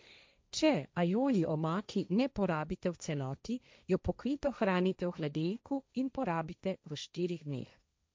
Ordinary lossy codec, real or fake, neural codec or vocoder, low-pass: none; fake; codec, 16 kHz, 1.1 kbps, Voila-Tokenizer; none